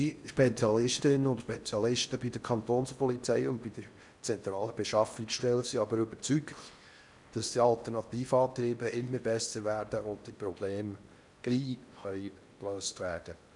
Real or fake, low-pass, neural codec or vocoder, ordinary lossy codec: fake; 10.8 kHz; codec, 16 kHz in and 24 kHz out, 0.6 kbps, FocalCodec, streaming, 4096 codes; none